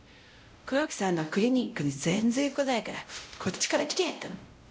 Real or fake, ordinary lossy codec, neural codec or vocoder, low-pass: fake; none; codec, 16 kHz, 0.5 kbps, X-Codec, WavLM features, trained on Multilingual LibriSpeech; none